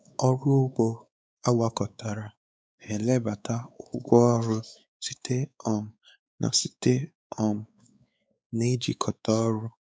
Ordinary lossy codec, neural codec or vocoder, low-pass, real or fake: none; codec, 16 kHz, 4 kbps, X-Codec, WavLM features, trained on Multilingual LibriSpeech; none; fake